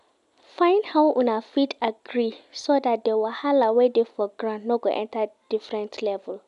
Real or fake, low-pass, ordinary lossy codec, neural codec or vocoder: real; 10.8 kHz; none; none